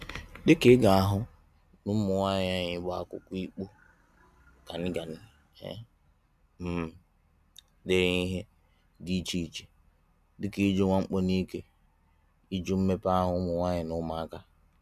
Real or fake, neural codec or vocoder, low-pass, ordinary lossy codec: real; none; 14.4 kHz; none